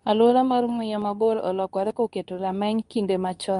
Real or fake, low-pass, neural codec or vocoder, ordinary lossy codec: fake; 10.8 kHz; codec, 24 kHz, 0.9 kbps, WavTokenizer, medium speech release version 2; MP3, 64 kbps